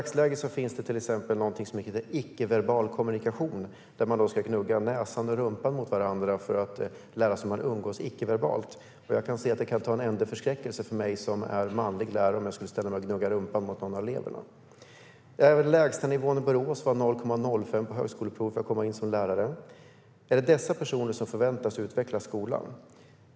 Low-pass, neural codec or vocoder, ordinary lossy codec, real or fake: none; none; none; real